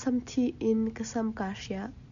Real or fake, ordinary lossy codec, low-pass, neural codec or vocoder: real; MP3, 96 kbps; 7.2 kHz; none